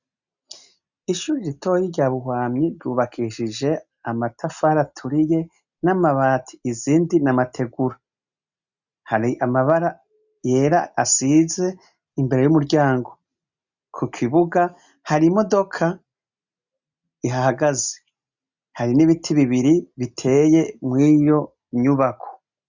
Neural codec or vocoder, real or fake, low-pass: none; real; 7.2 kHz